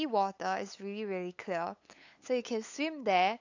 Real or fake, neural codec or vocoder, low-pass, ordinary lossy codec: fake; codec, 16 kHz, 8 kbps, FunCodec, trained on LibriTTS, 25 frames a second; 7.2 kHz; AAC, 48 kbps